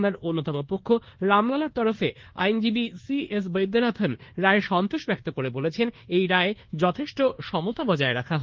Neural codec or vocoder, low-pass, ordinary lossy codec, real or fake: codec, 24 kHz, 1.2 kbps, DualCodec; 7.2 kHz; Opus, 16 kbps; fake